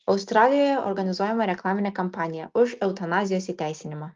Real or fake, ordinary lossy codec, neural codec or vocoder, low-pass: real; Opus, 32 kbps; none; 7.2 kHz